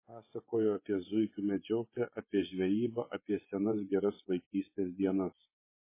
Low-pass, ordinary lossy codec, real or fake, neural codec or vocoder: 3.6 kHz; MP3, 16 kbps; fake; vocoder, 24 kHz, 100 mel bands, Vocos